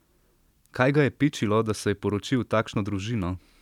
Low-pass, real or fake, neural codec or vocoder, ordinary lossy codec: 19.8 kHz; fake; vocoder, 44.1 kHz, 128 mel bands every 256 samples, BigVGAN v2; none